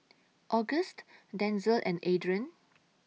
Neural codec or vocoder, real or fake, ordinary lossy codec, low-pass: none; real; none; none